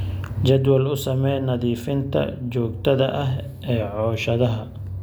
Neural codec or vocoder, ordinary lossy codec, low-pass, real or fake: none; none; none; real